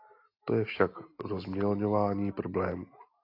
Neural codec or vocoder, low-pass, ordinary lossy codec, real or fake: none; 5.4 kHz; AAC, 32 kbps; real